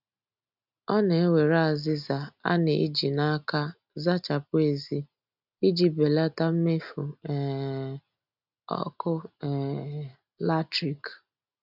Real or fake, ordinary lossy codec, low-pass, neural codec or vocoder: real; none; 5.4 kHz; none